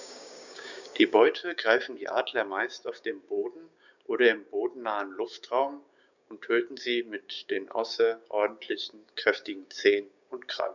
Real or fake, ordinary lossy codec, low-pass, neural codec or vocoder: fake; none; 7.2 kHz; codec, 16 kHz, 6 kbps, DAC